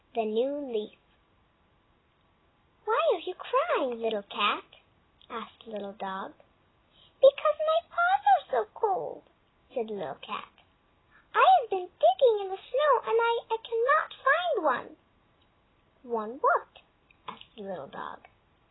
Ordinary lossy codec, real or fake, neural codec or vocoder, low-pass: AAC, 16 kbps; real; none; 7.2 kHz